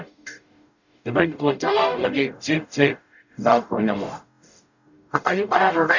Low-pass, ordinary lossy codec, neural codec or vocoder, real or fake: 7.2 kHz; none; codec, 44.1 kHz, 0.9 kbps, DAC; fake